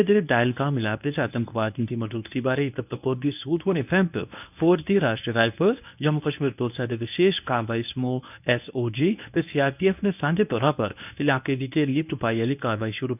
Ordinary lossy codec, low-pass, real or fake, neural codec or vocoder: none; 3.6 kHz; fake; codec, 24 kHz, 0.9 kbps, WavTokenizer, medium speech release version 1